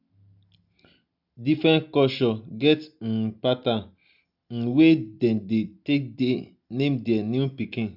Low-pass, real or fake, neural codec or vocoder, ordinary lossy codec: 5.4 kHz; real; none; none